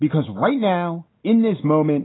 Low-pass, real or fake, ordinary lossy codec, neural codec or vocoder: 7.2 kHz; real; AAC, 16 kbps; none